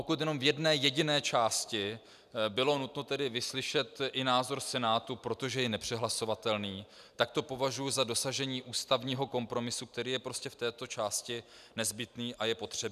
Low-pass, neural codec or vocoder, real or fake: 14.4 kHz; none; real